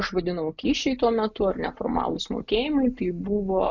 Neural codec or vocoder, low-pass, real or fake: none; 7.2 kHz; real